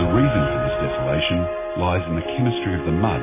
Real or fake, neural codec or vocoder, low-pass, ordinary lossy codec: real; none; 3.6 kHz; MP3, 16 kbps